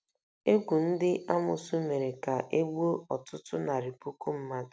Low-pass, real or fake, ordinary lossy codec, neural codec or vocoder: none; real; none; none